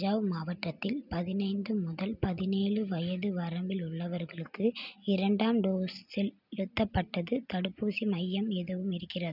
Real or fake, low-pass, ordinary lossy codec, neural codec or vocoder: real; 5.4 kHz; none; none